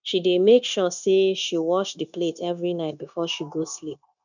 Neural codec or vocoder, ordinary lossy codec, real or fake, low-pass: codec, 16 kHz, 0.9 kbps, LongCat-Audio-Codec; none; fake; 7.2 kHz